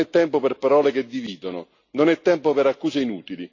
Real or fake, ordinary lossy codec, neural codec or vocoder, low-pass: real; AAC, 48 kbps; none; 7.2 kHz